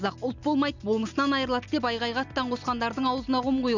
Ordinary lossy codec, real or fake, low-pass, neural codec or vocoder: none; real; 7.2 kHz; none